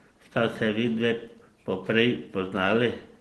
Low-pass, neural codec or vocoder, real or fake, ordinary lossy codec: 10.8 kHz; none; real; Opus, 16 kbps